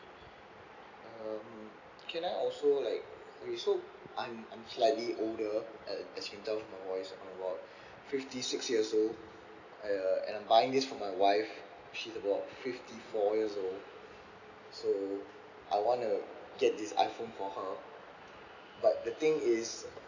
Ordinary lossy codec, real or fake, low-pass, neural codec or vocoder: none; real; 7.2 kHz; none